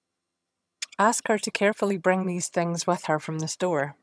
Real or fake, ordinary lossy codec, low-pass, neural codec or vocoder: fake; none; none; vocoder, 22.05 kHz, 80 mel bands, HiFi-GAN